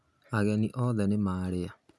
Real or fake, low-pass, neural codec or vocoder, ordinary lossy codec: real; none; none; none